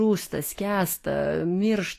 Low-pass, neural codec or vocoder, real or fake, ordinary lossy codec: 14.4 kHz; autoencoder, 48 kHz, 128 numbers a frame, DAC-VAE, trained on Japanese speech; fake; AAC, 48 kbps